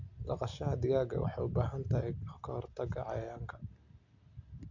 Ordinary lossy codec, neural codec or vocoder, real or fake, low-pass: none; none; real; 7.2 kHz